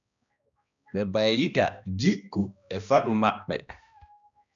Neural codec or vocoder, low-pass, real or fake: codec, 16 kHz, 1 kbps, X-Codec, HuBERT features, trained on balanced general audio; 7.2 kHz; fake